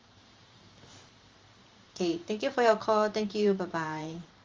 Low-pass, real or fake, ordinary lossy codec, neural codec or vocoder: 7.2 kHz; fake; Opus, 32 kbps; vocoder, 44.1 kHz, 128 mel bands every 512 samples, BigVGAN v2